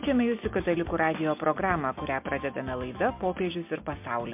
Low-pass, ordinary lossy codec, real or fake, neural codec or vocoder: 3.6 kHz; MP3, 32 kbps; real; none